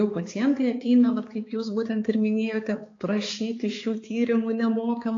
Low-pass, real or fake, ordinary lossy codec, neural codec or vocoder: 7.2 kHz; fake; AAC, 32 kbps; codec, 16 kHz, 4 kbps, X-Codec, HuBERT features, trained on balanced general audio